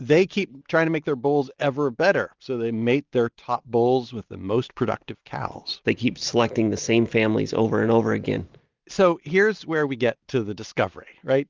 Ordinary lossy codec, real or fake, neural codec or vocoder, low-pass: Opus, 24 kbps; real; none; 7.2 kHz